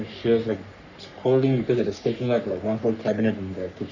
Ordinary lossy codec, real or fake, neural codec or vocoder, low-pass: Opus, 64 kbps; fake; codec, 44.1 kHz, 3.4 kbps, Pupu-Codec; 7.2 kHz